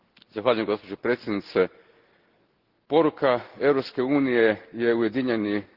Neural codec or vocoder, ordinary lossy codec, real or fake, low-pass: none; Opus, 16 kbps; real; 5.4 kHz